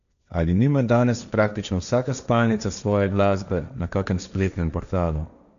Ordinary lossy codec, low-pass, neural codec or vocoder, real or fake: none; 7.2 kHz; codec, 16 kHz, 1.1 kbps, Voila-Tokenizer; fake